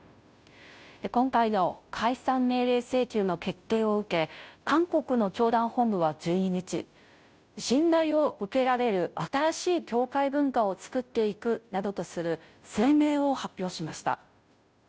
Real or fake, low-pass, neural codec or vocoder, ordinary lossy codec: fake; none; codec, 16 kHz, 0.5 kbps, FunCodec, trained on Chinese and English, 25 frames a second; none